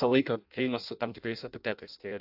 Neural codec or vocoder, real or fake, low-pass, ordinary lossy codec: codec, 16 kHz in and 24 kHz out, 0.6 kbps, FireRedTTS-2 codec; fake; 5.4 kHz; AAC, 48 kbps